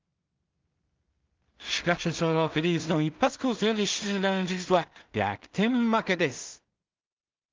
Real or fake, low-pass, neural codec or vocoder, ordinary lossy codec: fake; 7.2 kHz; codec, 16 kHz in and 24 kHz out, 0.4 kbps, LongCat-Audio-Codec, two codebook decoder; Opus, 24 kbps